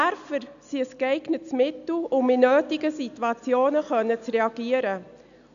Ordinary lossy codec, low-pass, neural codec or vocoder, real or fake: none; 7.2 kHz; none; real